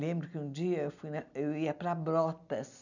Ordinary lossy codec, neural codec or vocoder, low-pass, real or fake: none; none; 7.2 kHz; real